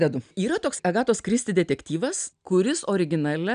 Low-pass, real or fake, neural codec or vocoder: 9.9 kHz; real; none